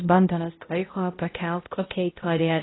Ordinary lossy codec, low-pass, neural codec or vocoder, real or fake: AAC, 16 kbps; 7.2 kHz; codec, 16 kHz, 0.5 kbps, X-Codec, HuBERT features, trained on balanced general audio; fake